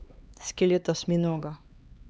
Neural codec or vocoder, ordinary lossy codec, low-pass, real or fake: codec, 16 kHz, 2 kbps, X-Codec, HuBERT features, trained on LibriSpeech; none; none; fake